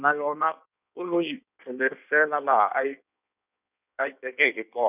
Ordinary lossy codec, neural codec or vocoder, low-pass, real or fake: none; codec, 16 kHz in and 24 kHz out, 1.1 kbps, FireRedTTS-2 codec; 3.6 kHz; fake